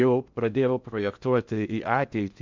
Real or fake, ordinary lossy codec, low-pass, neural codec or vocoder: fake; MP3, 64 kbps; 7.2 kHz; codec, 16 kHz in and 24 kHz out, 0.6 kbps, FocalCodec, streaming, 2048 codes